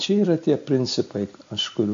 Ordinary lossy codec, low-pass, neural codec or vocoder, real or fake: MP3, 48 kbps; 7.2 kHz; none; real